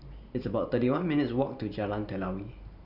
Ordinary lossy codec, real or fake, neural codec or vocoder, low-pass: none; fake; vocoder, 44.1 kHz, 128 mel bands every 512 samples, BigVGAN v2; 5.4 kHz